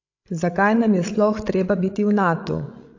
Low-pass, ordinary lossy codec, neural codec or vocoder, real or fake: 7.2 kHz; AAC, 48 kbps; codec, 16 kHz, 16 kbps, FreqCodec, larger model; fake